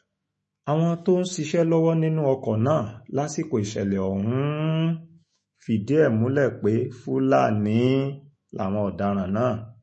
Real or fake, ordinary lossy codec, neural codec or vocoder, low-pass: fake; MP3, 32 kbps; autoencoder, 48 kHz, 128 numbers a frame, DAC-VAE, trained on Japanese speech; 10.8 kHz